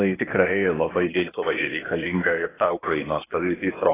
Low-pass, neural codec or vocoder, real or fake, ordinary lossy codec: 3.6 kHz; codec, 16 kHz, 0.8 kbps, ZipCodec; fake; AAC, 16 kbps